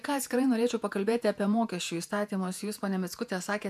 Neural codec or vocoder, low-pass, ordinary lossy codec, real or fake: vocoder, 48 kHz, 128 mel bands, Vocos; 14.4 kHz; MP3, 96 kbps; fake